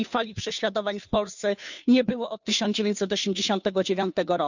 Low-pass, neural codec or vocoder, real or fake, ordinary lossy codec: 7.2 kHz; codec, 16 kHz, 2 kbps, FunCodec, trained on Chinese and English, 25 frames a second; fake; none